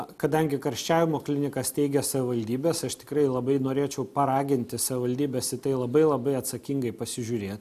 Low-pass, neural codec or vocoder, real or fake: 14.4 kHz; none; real